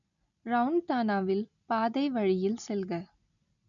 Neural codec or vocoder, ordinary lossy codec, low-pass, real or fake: none; none; 7.2 kHz; real